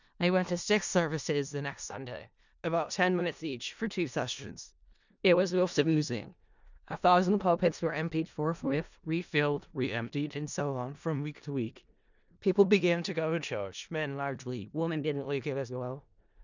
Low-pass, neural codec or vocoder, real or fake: 7.2 kHz; codec, 16 kHz in and 24 kHz out, 0.4 kbps, LongCat-Audio-Codec, four codebook decoder; fake